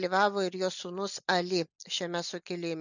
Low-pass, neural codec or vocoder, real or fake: 7.2 kHz; none; real